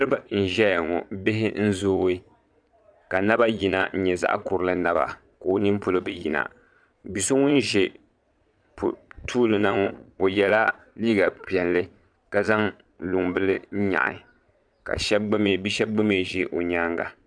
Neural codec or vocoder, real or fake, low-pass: vocoder, 22.05 kHz, 80 mel bands, Vocos; fake; 9.9 kHz